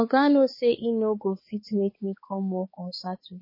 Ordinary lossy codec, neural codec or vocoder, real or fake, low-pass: MP3, 24 kbps; codec, 16 kHz, 4 kbps, X-Codec, HuBERT features, trained on balanced general audio; fake; 5.4 kHz